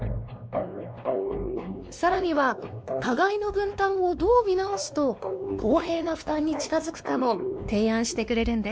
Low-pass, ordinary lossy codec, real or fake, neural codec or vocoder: none; none; fake; codec, 16 kHz, 2 kbps, X-Codec, WavLM features, trained on Multilingual LibriSpeech